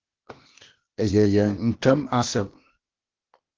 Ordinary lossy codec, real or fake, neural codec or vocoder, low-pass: Opus, 16 kbps; fake; codec, 16 kHz, 0.8 kbps, ZipCodec; 7.2 kHz